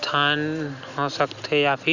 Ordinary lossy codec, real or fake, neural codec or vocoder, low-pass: none; real; none; 7.2 kHz